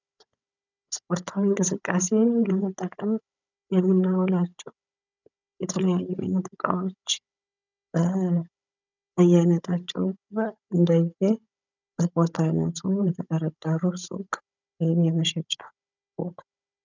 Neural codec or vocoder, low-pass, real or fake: codec, 16 kHz, 16 kbps, FunCodec, trained on Chinese and English, 50 frames a second; 7.2 kHz; fake